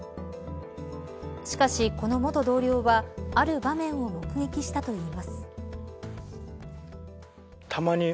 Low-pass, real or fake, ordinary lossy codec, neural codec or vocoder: none; real; none; none